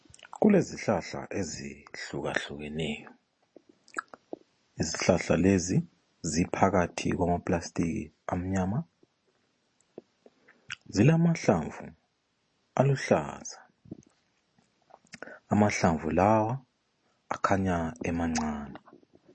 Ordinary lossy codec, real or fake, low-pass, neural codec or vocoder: MP3, 32 kbps; real; 9.9 kHz; none